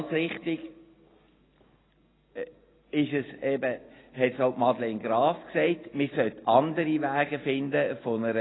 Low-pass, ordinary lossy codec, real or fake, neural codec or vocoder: 7.2 kHz; AAC, 16 kbps; real; none